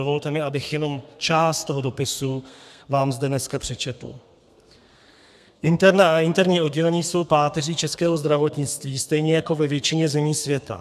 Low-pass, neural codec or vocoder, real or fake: 14.4 kHz; codec, 32 kHz, 1.9 kbps, SNAC; fake